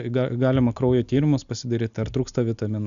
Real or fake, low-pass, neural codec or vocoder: real; 7.2 kHz; none